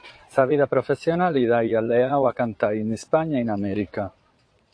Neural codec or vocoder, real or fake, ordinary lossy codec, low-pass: vocoder, 22.05 kHz, 80 mel bands, Vocos; fake; AAC, 48 kbps; 9.9 kHz